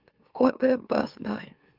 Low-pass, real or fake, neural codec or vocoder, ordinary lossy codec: 5.4 kHz; fake; autoencoder, 44.1 kHz, a latent of 192 numbers a frame, MeloTTS; Opus, 32 kbps